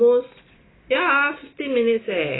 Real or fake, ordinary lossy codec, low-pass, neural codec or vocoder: real; AAC, 16 kbps; 7.2 kHz; none